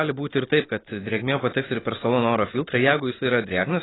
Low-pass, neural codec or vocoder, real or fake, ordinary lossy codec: 7.2 kHz; none; real; AAC, 16 kbps